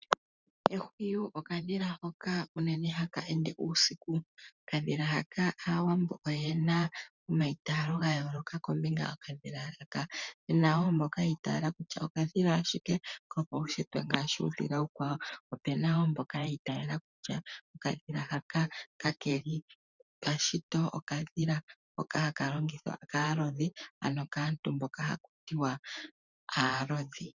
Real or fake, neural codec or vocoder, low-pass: fake; vocoder, 44.1 kHz, 128 mel bands every 512 samples, BigVGAN v2; 7.2 kHz